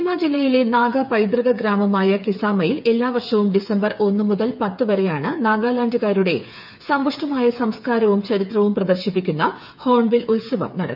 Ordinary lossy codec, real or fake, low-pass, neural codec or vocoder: none; fake; 5.4 kHz; codec, 16 kHz, 8 kbps, FreqCodec, smaller model